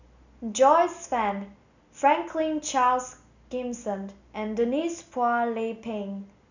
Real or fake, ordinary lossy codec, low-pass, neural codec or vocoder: real; none; 7.2 kHz; none